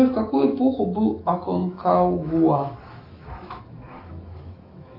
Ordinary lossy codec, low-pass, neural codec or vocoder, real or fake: AAC, 24 kbps; 5.4 kHz; autoencoder, 48 kHz, 128 numbers a frame, DAC-VAE, trained on Japanese speech; fake